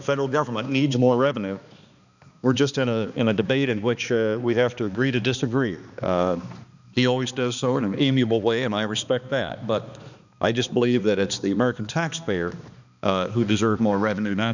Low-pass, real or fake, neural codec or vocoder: 7.2 kHz; fake; codec, 16 kHz, 2 kbps, X-Codec, HuBERT features, trained on balanced general audio